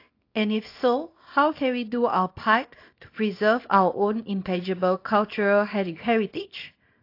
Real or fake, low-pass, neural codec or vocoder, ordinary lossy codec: fake; 5.4 kHz; codec, 24 kHz, 0.9 kbps, WavTokenizer, small release; AAC, 32 kbps